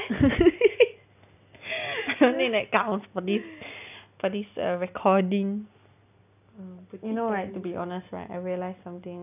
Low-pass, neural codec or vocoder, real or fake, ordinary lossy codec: 3.6 kHz; none; real; none